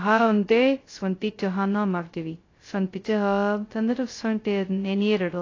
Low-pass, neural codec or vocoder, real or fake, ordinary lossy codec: 7.2 kHz; codec, 16 kHz, 0.2 kbps, FocalCodec; fake; AAC, 32 kbps